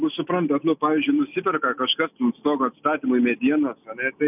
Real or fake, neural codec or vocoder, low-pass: real; none; 3.6 kHz